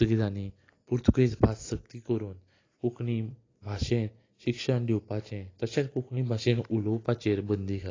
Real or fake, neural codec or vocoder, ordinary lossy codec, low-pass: real; none; AAC, 32 kbps; 7.2 kHz